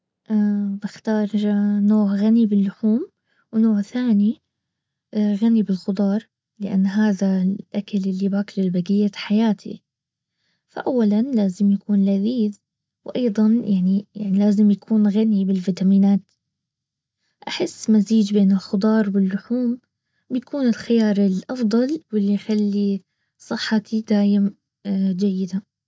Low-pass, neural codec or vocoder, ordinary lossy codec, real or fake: none; none; none; real